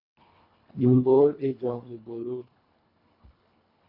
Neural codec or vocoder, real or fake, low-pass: codec, 24 kHz, 1.5 kbps, HILCodec; fake; 5.4 kHz